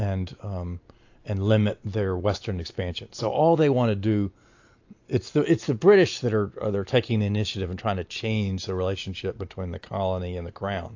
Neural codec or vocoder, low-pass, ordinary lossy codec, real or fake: none; 7.2 kHz; AAC, 48 kbps; real